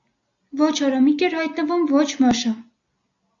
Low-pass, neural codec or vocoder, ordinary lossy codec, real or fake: 7.2 kHz; none; AAC, 64 kbps; real